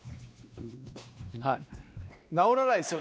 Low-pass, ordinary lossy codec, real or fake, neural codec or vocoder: none; none; fake; codec, 16 kHz, 2 kbps, X-Codec, WavLM features, trained on Multilingual LibriSpeech